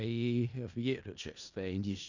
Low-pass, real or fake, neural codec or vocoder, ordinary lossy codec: 7.2 kHz; fake; codec, 16 kHz in and 24 kHz out, 0.4 kbps, LongCat-Audio-Codec, four codebook decoder; none